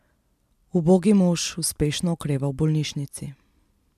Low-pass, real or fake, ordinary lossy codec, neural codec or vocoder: 14.4 kHz; real; MP3, 96 kbps; none